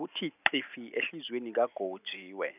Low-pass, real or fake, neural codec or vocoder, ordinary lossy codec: 3.6 kHz; real; none; none